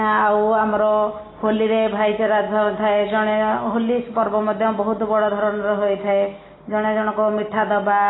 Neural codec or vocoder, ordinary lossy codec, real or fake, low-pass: none; AAC, 16 kbps; real; 7.2 kHz